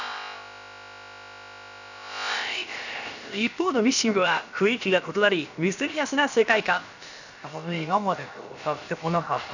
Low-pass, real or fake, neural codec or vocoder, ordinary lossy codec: 7.2 kHz; fake; codec, 16 kHz, about 1 kbps, DyCAST, with the encoder's durations; none